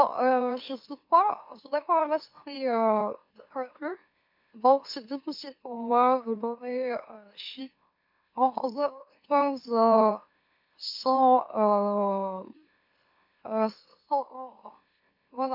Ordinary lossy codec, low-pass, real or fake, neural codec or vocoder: MP3, 48 kbps; 5.4 kHz; fake; autoencoder, 44.1 kHz, a latent of 192 numbers a frame, MeloTTS